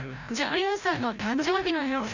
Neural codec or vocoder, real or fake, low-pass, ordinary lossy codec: codec, 16 kHz, 0.5 kbps, FreqCodec, larger model; fake; 7.2 kHz; none